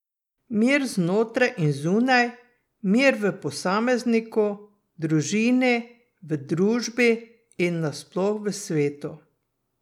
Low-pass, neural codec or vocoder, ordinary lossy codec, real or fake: 19.8 kHz; none; none; real